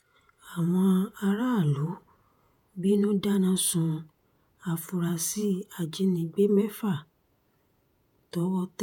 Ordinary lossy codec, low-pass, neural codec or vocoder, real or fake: none; none; vocoder, 48 kHz, 128 mel bands, Vocos; fake